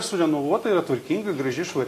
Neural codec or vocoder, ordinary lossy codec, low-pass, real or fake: none; AAC, 48 kbps; 14.4 kHz; real